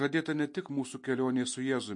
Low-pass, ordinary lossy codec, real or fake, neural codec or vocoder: 10.8 kHz; MP3, 48 kbps; real; none